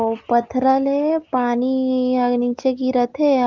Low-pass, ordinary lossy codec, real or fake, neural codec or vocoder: 7.2 kHz; Opus, 32 kbps; real; none